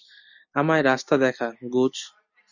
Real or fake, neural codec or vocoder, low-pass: real; none; 7.2 kHz